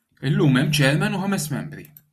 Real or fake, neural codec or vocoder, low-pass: real; none; 14.4 kHz